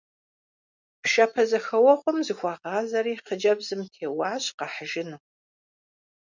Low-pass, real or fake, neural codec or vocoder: 7.2 kHz; real; none